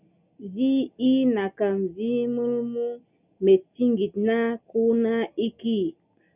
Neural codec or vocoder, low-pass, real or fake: none; 3.6 kHz; real